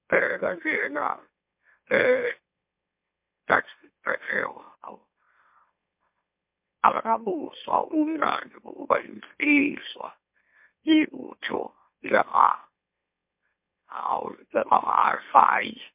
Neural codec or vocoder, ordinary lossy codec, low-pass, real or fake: autoencoder, 44.1 kHz, a latent of 192 numbers a frame, MeloTTS; MP3, 32 kbps; 3.6 kHz; fake